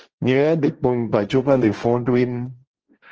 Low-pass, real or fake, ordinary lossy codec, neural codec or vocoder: 7.2 kHz; fake; Opus, 16 kbps; codec, 16 kHz, 1.1 kbps, Voila-Tokenizer